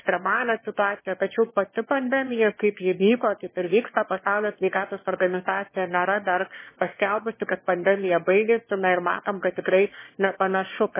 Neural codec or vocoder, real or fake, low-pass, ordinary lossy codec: autoencoder, 22.05 kHz, a latent of 192 numbers a frame, VITS, trained on one speaker; fake; 3.6 kHz; MP3, 16 kbps